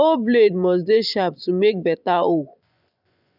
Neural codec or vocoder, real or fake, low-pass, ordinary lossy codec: vocoder, 24 kHz, 100 mel bands, Vocos; fake; 5.4 kHz; none